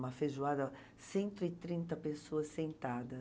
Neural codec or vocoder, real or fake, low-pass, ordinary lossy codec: none; real; none; none